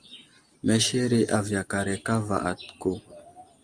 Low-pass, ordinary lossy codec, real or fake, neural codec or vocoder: 9.9 kHz; Opus, 32 kbps; real; none